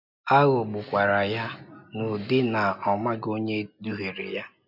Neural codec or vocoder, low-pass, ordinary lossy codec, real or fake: none; 5.4 kHz; none; real